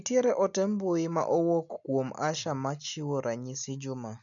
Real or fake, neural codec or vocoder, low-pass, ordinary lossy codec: real; none; 7.2 kHz; none